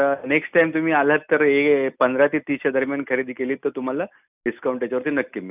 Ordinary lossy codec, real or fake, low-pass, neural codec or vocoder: none; real; 3.6 kHz; none